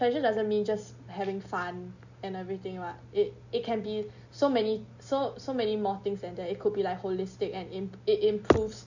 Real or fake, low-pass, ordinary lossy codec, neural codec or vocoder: real; 7.2 kHz; none; none